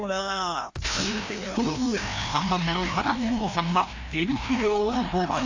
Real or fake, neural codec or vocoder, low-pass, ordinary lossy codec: fake; codec, 16 kHz, 1 kbps, FreqCodec, larger model; 7.2 kHz; AAC, 48 kbps